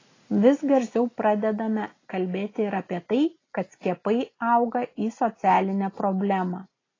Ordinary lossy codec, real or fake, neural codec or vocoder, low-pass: AAC, 32 kbps; real; none; 7.2 kHz